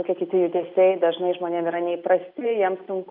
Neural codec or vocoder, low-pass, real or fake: none; 5.4 kHz; real